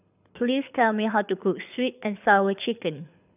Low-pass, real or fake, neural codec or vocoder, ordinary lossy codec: 3.6 kHz; fake; codec, 24 kHz, 6 kbps, HILCodec; none